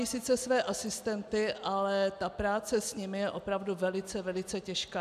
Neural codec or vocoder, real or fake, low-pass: none; real; 14.4 kHz